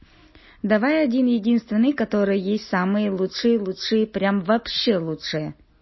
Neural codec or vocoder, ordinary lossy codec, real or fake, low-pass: none; MP3, 24 kbps; real; 7.2 kHz